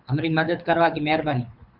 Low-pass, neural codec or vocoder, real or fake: 5.4 kHz; codec, 24 kHz, 6 kbps, HILCodec; fake